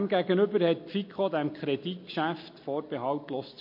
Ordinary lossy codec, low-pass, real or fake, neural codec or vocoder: MP3, 32 kbps; 5.4 kHz; real; none